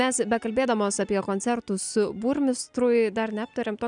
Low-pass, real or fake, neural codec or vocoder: 9.9 kHz; real; none